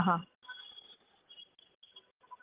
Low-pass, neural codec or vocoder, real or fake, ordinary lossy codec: 3.6 kHz; none; real; Opus, 32 kbps